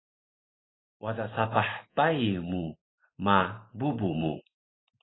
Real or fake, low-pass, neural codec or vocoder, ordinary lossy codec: fake; 7.2 kHz; vocoder, 44.1 kHz, 128 mel bands every 512 samples, BigVGAN v2; AAC, 16 kbps